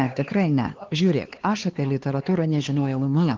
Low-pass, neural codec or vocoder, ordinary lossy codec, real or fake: 7.2 kHz; codec, 16 kHz, 2 kbps, X-Codec, HuBERT features, trained on LibriSpeech; Opus, 16 kbps; fake